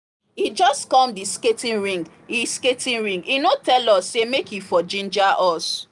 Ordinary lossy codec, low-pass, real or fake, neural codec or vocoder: none; 10.8 kHz; real; none